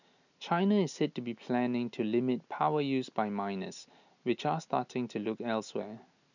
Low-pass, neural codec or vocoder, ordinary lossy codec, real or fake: 7.2 kHz; none; none; real